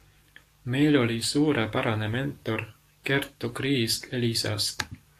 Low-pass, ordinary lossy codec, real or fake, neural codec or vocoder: 14.4 kHz; AAC, 64 kbps; fake; codec, 44.1 kHz, 7.8 kbps, DAC